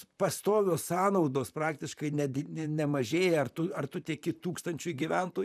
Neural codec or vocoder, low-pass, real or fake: vocoder, 44.1 kHz, 128 mel bands, Pupu-Vocoder; 14.4 kHz; fake